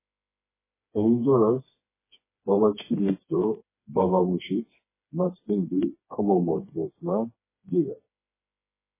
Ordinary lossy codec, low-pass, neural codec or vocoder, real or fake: MP3, 16 kbps; 3.6 kHz; codec, 16 kHz, 2 kbps, FreqCodec, smaller model; fake